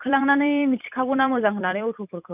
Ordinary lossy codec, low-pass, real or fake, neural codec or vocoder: none; 3.6 kHz; fake; vocoder, 44.1 kHz, 128 mel bands every 256 samples, BigVGAN v2